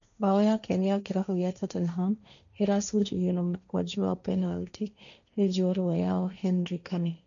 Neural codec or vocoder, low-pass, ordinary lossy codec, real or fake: codec, 16 kHz, 1.1 kbps, Voila-Tokenizer; 7.2 kHz; none; fake